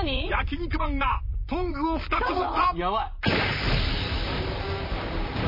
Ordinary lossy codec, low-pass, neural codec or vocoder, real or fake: MP3, 24 kbps; 5.4 kHz; vocoder, 44.1 kHz, 128 mel bands, Pupu-Vocoder; fake